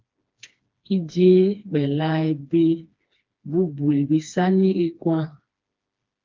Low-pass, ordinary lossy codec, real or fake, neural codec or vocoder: 7.2 kHz; Opus, 24 kbps; fake; codec, 16 kHz, 2 kbps, FreqCodec, smaller model